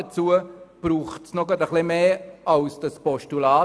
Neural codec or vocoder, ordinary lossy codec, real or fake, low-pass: none; none; real; none